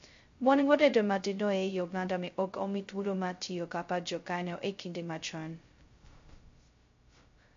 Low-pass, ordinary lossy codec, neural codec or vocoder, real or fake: 7.2 kHz; MP3, 48 kbps; codec, 16 kHz, 0.2 kbps, FocalCodec; fake